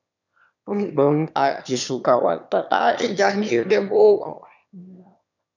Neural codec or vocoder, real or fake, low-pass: autoencoder, 22.05 kHz, a latent of 192 numbers a frame, VITS, trained on one speaker; fake; 7.2 kHz